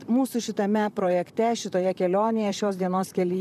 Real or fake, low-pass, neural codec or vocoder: fake; 14.4 kHz; vocoder, 44.1 kHz, 128 mel bands, Pupu-Vocoder